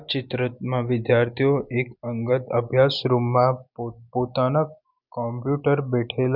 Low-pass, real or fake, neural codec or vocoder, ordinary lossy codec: 5.4 kHz; real; none; none